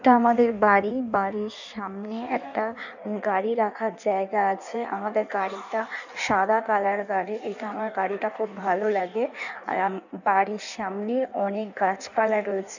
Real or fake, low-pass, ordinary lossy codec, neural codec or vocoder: fake; 7.2 kHz; none; codec, 16 kHz in and 24 kHz out, 1.1 kbps, FireRedTTS-2 codec